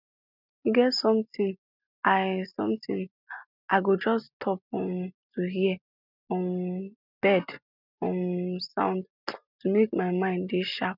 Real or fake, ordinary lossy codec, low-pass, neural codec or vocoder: real; none; 5.4 kHz; none